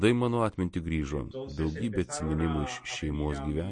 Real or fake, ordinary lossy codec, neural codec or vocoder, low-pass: real; MP3, 48 kbps; none; 9.9 kHz